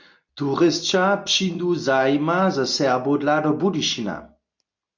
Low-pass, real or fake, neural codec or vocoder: 7.2 kHz; real; none